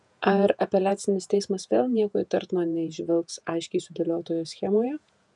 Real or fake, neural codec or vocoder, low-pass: fake; vocoder, 48 kHz, 128 mel bands, Vocos; 10.8 kHz